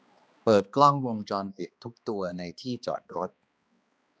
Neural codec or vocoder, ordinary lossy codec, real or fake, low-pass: codec, 16 kHz, 2 kbps, X-Codec, HuBERT features, trained on balanced general audio; none; fake; none